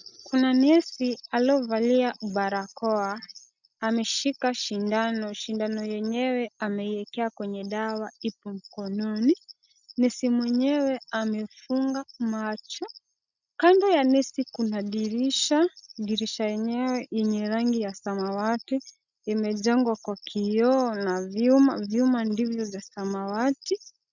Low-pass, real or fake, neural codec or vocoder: 7.2 kHz; real; none